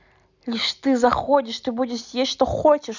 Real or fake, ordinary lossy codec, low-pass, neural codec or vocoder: real; none; 7.2 kHz; none